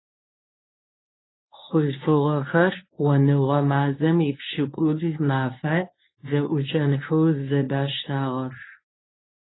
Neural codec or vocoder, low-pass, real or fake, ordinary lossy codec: codec, 24 kHz, 0.9 kbps, WavTokenizer, medium speech release version 1; 7.2 kHz; fake; AAC, 16 kbps